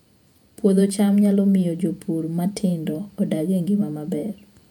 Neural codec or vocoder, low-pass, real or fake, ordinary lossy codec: vocoder, 48 kHz, 128 mel bands, Vocos; 19.8 kHz; fake; none